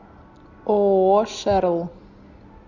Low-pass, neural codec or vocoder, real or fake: 7.2 kHz; none; real